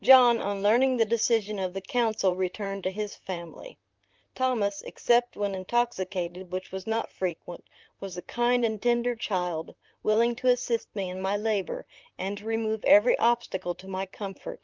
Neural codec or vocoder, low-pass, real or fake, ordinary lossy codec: vocoder, 44.1 kHz, 128 mel bands, Pupu-Vocoder; 7.2 kHz; fake; Opus, 24 kbps